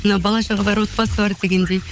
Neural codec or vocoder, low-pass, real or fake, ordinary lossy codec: codec, 16 kHz, 16 kbps, FunCodec, trained on LibriTTS, 50 frames a second; none; fake; none